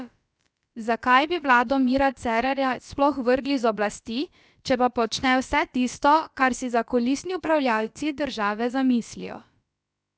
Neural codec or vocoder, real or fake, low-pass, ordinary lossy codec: codec, 16 kHz, about 1 kbps, DyCAST, with the encoder's durations; fake; none; none